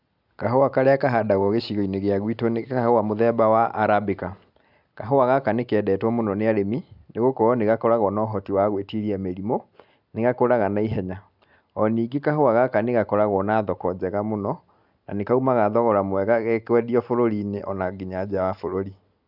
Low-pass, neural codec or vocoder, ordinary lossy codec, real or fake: 5.4 kHz; none; none; real